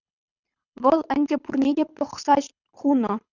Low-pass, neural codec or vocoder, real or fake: 7.2 kHz; codec, 24 kHz, 6 kbps, HILCodec; fake